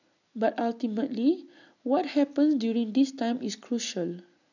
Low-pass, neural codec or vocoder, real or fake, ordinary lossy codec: 7.2 kHz; none; real; none